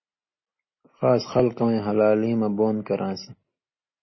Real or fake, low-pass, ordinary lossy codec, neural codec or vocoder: real; 7.2 kHz; MP3, 24 kbps; none